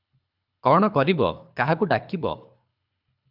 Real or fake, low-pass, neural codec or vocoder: fake; 5.4 kHz; codec, 24 kHz, 3 kbps, HILCodec